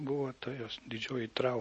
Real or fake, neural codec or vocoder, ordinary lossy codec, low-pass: real; none; MP3, 32 kbps; 9.9 kHz